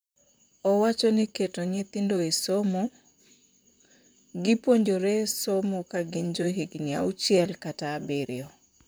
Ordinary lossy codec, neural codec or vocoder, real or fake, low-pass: none; vocoder, 44.1 kHz, 128 mel bands, Pupu-Vocoder; fake; none